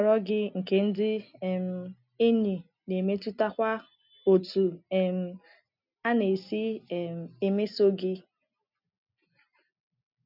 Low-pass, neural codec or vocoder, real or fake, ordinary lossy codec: 5.4 kHz; none; real; none